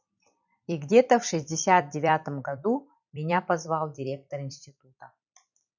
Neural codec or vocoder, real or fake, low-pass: vocoder, 44.1 kHz, 128 mel bands every 256 samples, BigVGAN v2; fake; 7.2 kHz